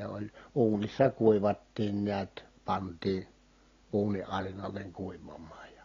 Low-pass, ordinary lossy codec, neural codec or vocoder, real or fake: 7.2 kHz; AAC, 32 kbps; none; real